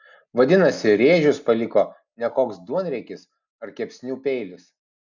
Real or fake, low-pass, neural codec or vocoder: real; 7.2 kHz; none